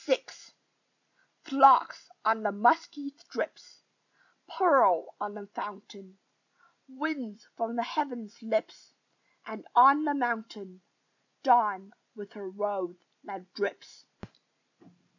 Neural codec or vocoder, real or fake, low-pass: none; real; 7.2 kHz